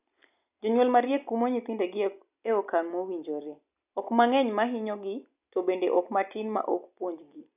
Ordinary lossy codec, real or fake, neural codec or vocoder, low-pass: none; real; none; 3.6 kHz